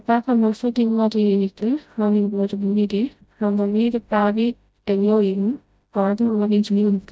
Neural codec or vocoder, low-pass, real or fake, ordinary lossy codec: codec, 16 kHz, 0.5 kbps, FreqCodec, smaller model; none; fake; none